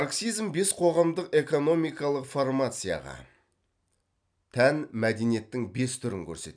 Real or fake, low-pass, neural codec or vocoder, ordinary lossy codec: real; 9.9 kHz; none; none